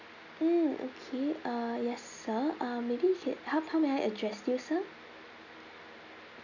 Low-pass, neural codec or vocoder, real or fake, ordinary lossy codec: 7.2 kHz; none; real; none